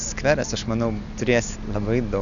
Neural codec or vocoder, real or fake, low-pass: none; real; 7.2 kHz